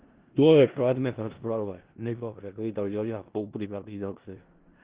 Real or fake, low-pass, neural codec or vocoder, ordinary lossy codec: fake; 3.6 kHz; codec, 16 kHz in and 24 kHz out, 0.4 kbps, LongCat-Audio-Codec, four codebook decoder; Opus, 16 kbps